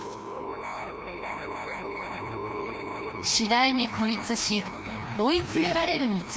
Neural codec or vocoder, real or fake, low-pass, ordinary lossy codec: codec, 16 kHz, 1 kbps, FreqCodec, larger model; fake; none; none